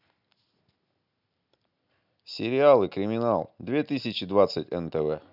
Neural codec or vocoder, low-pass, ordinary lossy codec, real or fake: none; 5.4 kHz; none; real